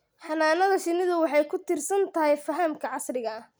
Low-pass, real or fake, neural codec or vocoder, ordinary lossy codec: none; real; none; none